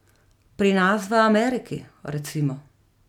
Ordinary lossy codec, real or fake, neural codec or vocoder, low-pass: none; real; none; 19.8 kHz